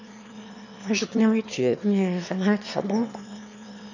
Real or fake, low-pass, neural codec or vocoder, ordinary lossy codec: fake; 7.2 kHz; autoencoder, 22.05 kHz, a latent of 192 numbers a frame, VITS, trained on one speaker; none